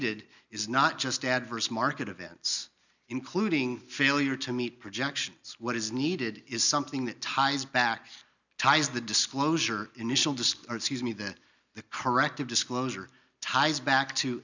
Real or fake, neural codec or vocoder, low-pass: real; none; 7.2 kHz